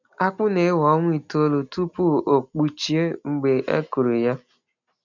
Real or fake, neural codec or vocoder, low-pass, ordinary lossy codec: real; none; 7.2 kHz; none